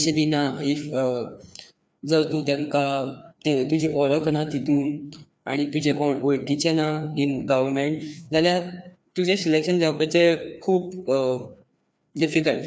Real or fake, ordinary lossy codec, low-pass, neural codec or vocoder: fake; none; none; codec, 16 kHz, 2 kbps, FreqCodec, larger model